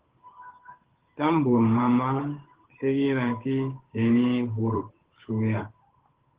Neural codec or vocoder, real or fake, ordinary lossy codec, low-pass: codec, 16 kHz, 8 kbps, FunCodec, trained on Chinese and English, 25 frames a second; fake; Opus, 16 kbps; 3.6 kHz